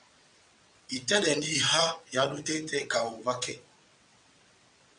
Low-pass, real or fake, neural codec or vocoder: 9.9 kHz; fake; vocoder, 22.05 kHz, 80 mel bands, WaveNeXt